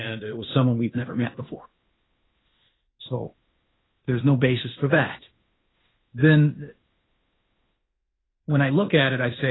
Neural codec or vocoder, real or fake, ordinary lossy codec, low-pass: codec, 16 kHz in and 24 kHz out, 0.9 kbps, LongCat-Audio-Codec, fine tuned four codebook decoder; fake; AAC, 16 kbps; 7.2 kHz